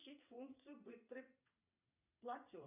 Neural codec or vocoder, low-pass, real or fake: vocoder, 22.05 kHz, 80 mel bands, WaveNeXt; 3.6 kHz; fake